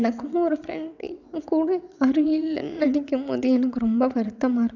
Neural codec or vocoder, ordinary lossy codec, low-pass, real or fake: vocoder, 22.05 kHz, 80 mel bands, WaveNeXt; none; 7.2 kHz; fake